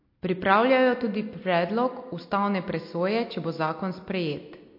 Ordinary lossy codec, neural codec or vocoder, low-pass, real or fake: MP3, 32 kbps; none; 5.4 kHz; real